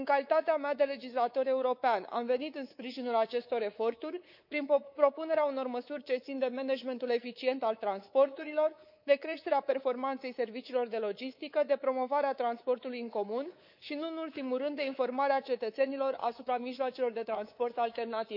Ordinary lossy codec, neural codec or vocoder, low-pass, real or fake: none; codec, 24 kHz, 3.1 kbps, DualCodec; 5.4 kHz; fake